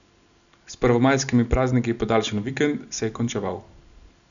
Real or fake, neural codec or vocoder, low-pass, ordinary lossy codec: real; none; 7.2 kHz; none